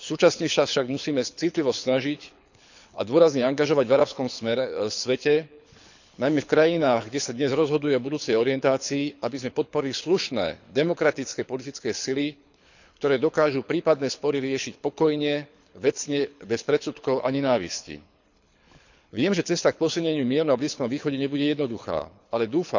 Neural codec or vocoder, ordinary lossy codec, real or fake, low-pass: codec, 24 kHz, 6 kbps, HILCodec; none; fake; 7.2 kHz